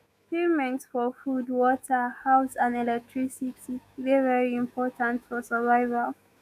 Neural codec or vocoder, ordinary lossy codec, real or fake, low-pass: autoencoder, 48 kHz, 128 numbers a frame, DAC-VAE, trained on Japanese speech; none; fake; 14.4 kHz